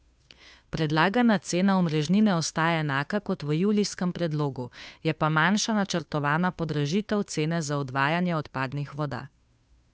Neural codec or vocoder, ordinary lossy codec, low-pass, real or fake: codec, 16 kHz, 2 kbps, FunCodec, trained on Chinese and English, 25 frames a second; none; none; fake